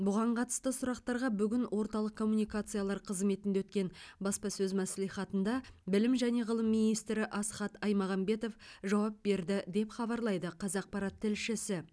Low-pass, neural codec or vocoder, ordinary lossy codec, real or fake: none; none; none; real